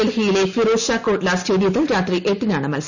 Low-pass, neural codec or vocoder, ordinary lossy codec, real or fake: 7.2 kHz; none; none; real